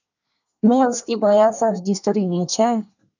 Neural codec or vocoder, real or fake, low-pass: codec, 24 kHz, 1 kbps, SNAC; fake; 7.2 kHz